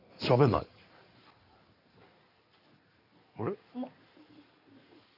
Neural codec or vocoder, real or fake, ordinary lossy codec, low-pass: codec, 16 kHz in and 24 kHz out, 2.2 kbps, FireRedTTS-2 codec; fake; none; 5.4 kHz